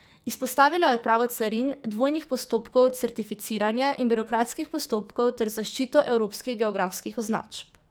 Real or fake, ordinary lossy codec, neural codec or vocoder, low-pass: fake; none; codec, 44.1 kHz, 2.6 kbps, SNAC; none